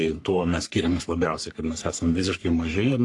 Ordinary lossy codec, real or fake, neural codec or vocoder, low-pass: AAC, 48 kbps; fake; codec, 44.1 kHz, 3.4 kbps, Pupu-Codec; 10.8 kHz